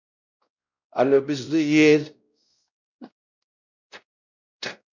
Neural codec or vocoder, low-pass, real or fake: codec, 16 kHz, 0.5 kbps, X-Codec, WavLM features, trained on Multilingual LibriSpeech; 7.2 kHz; fake